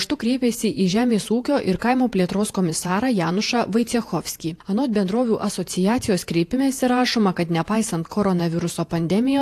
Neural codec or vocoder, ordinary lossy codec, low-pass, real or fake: vocoder, 48 kHz, 128 mel bands, Vocos; AAC, 64 kbps; 14.4 kHz; fake